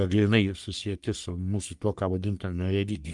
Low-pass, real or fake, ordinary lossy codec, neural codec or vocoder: 10.8 kHz; fake; Opus, 32 kbps; codec, 44.1 kHz, 3.4 kbps, Pupu-Codec